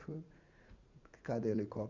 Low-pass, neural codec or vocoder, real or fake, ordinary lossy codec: 7.2 kHz; vocoder, 44.1 kHz, 80 mel bands, Vocos; fake; Opus, 64 kbps